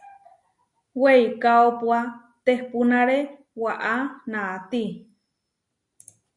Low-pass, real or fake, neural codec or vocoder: 10.8 kHz; real; none